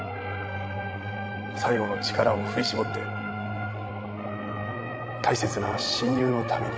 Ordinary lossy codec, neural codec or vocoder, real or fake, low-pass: none; codec, 16 kHz, 16 kbps, FreqCodec, larger model; fake; none